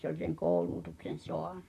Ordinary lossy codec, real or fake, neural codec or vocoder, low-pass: none; fake; vocoder, 44.1 kHz, 128 mel bands, Pupu-Vocoder; 14.4 kHz